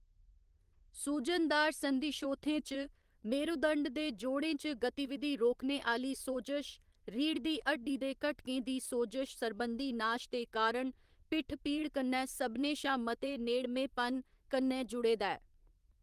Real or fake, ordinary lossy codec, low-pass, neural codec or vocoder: fake; Opus, 24 kbps; 14.4 kHz; vocoder, 44.1 kHz, 128 mel bands, Pupu-Vocoder